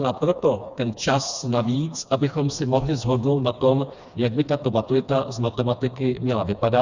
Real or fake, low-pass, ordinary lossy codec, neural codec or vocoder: fake; 7.2 kHz; Opus, 64 kbps; codec, 16 kHz, 2 kbps, FreqCodec, smaller model